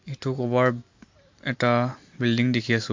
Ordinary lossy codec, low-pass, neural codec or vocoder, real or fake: MP3, 48 kbps; 7.2 kHz; none; real